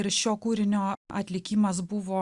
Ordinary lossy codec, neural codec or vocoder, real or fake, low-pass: Opus, 64 kbps; none; real; 10.8 kHz